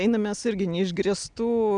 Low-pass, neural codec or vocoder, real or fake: 9.9 kHz; none; real